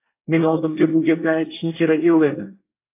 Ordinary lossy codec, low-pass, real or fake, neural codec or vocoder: AAC, 24 kbps; 3.6 kHz; fake; codec, 24 kHz, 1 kbps, SNAC